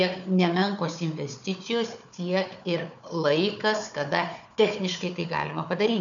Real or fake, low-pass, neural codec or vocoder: fake; 7.2 kHz; codec, 16 kHz, 4 kbps, FunCodec, trained on Chinese and English, 50 frames a second